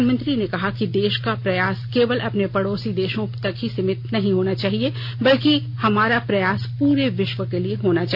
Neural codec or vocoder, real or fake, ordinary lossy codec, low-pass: none; real; AAC, 48 kbps; 5.4 kHz